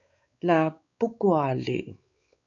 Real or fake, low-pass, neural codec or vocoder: fake; 7.2 kHz; codec, 16 kHz, 4 kbps, X-Codec, WavLM features, trained on Multilingual LibriSpeech